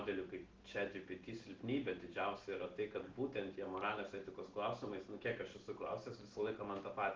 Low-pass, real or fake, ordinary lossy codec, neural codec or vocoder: 7.2 kHz; real; Opus, 16 kbps; none